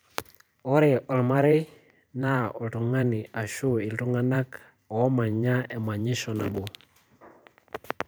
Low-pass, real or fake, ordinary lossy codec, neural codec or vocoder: none; fake; none; vocoder, 44.1 kHz, 128 mel bands every 512 samples, BigVGAN v2